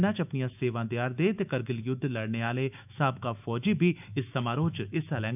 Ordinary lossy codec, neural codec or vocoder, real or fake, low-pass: none; autoencoder, 48 kHz, 128 numbers a frame, DAC-VAE, trained on Japanese speech; fake; 3.6 kHz